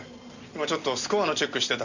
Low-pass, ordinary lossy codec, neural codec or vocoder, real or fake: 7.2 kHz; none; none; real